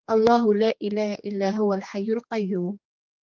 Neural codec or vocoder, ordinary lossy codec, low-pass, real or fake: codec, 16 kHz, 2 kbps, X-Codec, HuBERT features, trained on general audio; Opus, 32 kbps; 7.2 kHz; fake